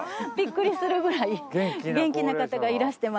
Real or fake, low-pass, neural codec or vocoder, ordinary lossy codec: real; none; none; none